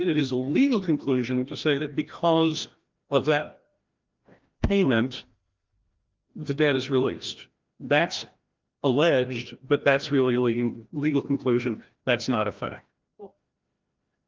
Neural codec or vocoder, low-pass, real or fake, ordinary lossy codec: codec, 16 kHz, 1 kbps, FreqCodec, larger model; 7.2 kHz; fake; Opus, 32 kbps